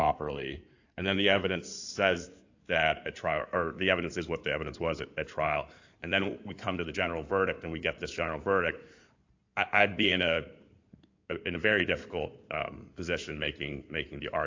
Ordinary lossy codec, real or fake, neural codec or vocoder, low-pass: AAC, 48 kbps; fake; codec, 16 kHz in and 24 kHz out, 2.2 kbps, FireRedTTS-2 codec; 7.2 kHz